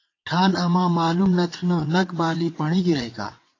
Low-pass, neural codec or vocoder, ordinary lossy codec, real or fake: 7.2 kHz; vocoder, 22.05 kHz, 80 mel bands, WaveNeXt; AAC, 32 kbps; fake